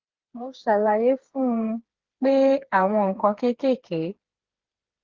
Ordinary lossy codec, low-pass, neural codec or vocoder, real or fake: Opus, 16 kbps; 7.2 kHz; codec, 16 kHz, 4 kbps, FreqCodec, smaller model; fake